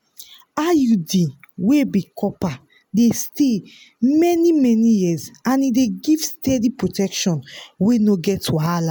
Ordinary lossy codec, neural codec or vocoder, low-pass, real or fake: none; none; none; real